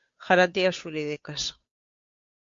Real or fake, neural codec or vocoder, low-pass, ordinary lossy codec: fake; codec, 16 kHz, 2 kbps, FunCodec, trained on Chinese and English, 25 frames a second; 7.2 kHz; MP3, 48 kbps